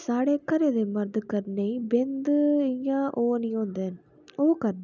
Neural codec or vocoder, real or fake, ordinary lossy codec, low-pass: none; real; none; 7.2 kHz